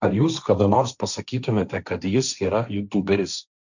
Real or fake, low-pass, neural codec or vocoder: fake; 7.2 kHz; codec, 16 kHz, 1.1 kbps, Voila-Tokenizer